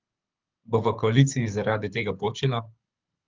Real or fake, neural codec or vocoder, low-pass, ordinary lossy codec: fake; codec, 24 kHz, 6 kbps, HILCodec; 7.2 kHz; Opus, 24 kbps